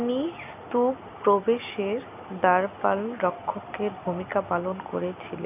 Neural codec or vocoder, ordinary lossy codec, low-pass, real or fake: none; none; 3.6 kHz; real